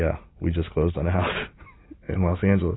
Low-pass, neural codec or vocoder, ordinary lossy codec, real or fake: 7.2 kHz; none; AAC, 16 kbps; real